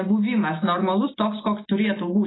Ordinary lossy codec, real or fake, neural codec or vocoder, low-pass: AAC, 16 kbps; real; none; 7.2 kHz